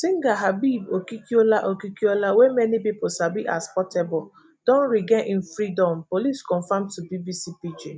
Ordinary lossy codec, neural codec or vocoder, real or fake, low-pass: none; none; real; none